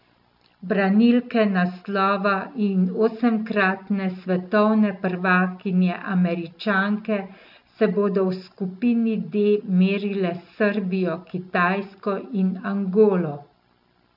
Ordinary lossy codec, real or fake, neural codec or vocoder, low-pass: none; real; none; 5.4 kHz